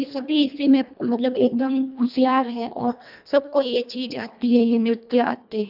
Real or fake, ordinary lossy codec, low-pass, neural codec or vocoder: fake; none; 5.4 kHz; codec, 24 kHz, 1.5 kbps, HILCodec